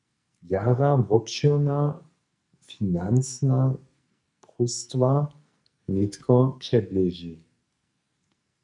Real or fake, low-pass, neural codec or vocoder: fake; 10.8 kHz; codec, 44.1 kHz, 2.6 kbps, SNAC